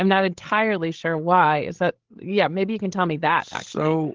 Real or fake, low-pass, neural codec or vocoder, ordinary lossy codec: fake; 7.2 kHz; codec, 16 kHz, 16 kbps, FreqCodec, larger model; Opus, 16 kbps